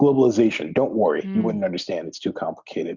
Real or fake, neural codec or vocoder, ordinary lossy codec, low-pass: real; none; Opus, 64 kbps; 7.2 kHz